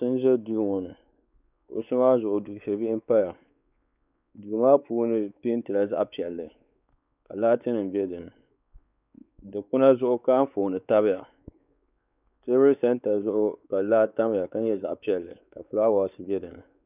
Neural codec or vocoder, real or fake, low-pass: codec, 16 kHz, 4 kbps, X-Codec, WavLM features, trained on Multilingual LibriSpeech; fake; 3.6 kHz